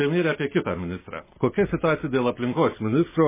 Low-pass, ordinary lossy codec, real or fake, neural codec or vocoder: 3.6 kHz; MP3, 16 kbps; real; none